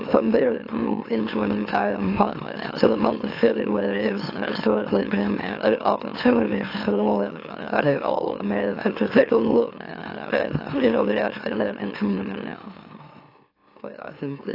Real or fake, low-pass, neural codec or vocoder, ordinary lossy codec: fake; 5.4 kHz; autoencoder, 44.1 kHz, a latent of 192 numbers a frame, MeloTTS; AAC, 32 kbps